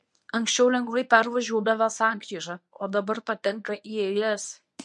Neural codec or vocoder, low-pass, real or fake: codec, 24 kHz, 0.9 kbps, WavTokenizer, medium speech release version 1; 10.8 kHz; fake